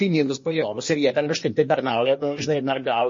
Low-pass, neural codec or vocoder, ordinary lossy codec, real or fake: 7.2 kHz; codec, 16 kHz, 0.8 kbps, ZipCodec; MP3, 32 kbps; fake